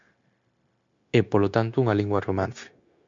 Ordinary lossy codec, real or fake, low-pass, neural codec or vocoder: MP3, 48 kbps; fake; 7.2 kHz; codec, 16 kHz, 0.9 kbps, LongCat-Audio-Codec